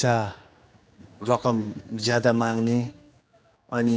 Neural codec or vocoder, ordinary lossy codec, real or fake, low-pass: codec, 16 kHz, 2 kbps, X-Codec, HuBERT features, trained on general audio; none; fake; none